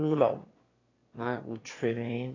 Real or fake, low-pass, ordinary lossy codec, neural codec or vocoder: fake; 7.2 kHz; AAC, 32 kbps; autoencoder, 22.05 kHz, a latent of 192 numbers a frame, VITS, trained on one speaker